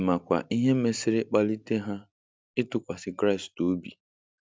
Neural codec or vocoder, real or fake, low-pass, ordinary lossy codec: none; real; none; none